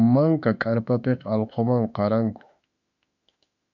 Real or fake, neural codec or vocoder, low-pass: fake; autoencoder, 48 kHz, 32 numbers a frame, DAC-VAE, trained on Japanese speech; 7.2 kHz